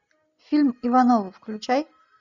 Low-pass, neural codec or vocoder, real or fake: 7.2 kHz; none; real